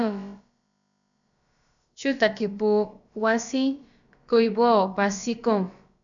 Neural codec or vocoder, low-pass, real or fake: codec, 16 kHz, about 1 kbps, DyCAST, with the encoder's durations; 7.2 kHz; fake